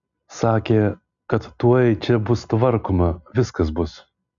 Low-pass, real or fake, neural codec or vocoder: 7.2 kHz; real; none